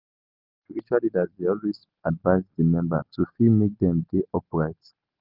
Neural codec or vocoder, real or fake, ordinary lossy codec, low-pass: none; real; Opus, 32 kbps; 5.4 kHz